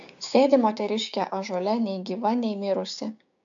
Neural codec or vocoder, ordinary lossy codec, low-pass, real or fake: codec, 16 kHz, 6 kbps, DAC; AAC, 64 kbps; 7.2 kHz; fake